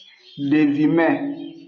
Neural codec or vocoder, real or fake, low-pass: none; real; 7.2 kHz